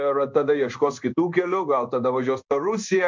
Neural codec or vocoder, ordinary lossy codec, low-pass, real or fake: codec, 16 kHz in and 24 kHz out, 1 kbps, XY-Tokenizer; MP3, 64 kbps; 7.2 kHz; fake